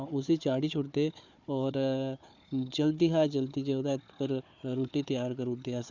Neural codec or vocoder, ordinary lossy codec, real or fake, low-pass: codec, 16 kHz, 4 kbps, FunCodec, trained on Chinese and English, 50 frames a second; none; fake; 7.2 kHz